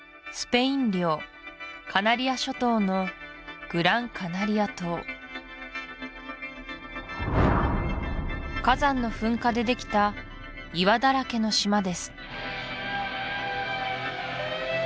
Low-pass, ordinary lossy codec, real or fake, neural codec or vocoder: none; none; real; none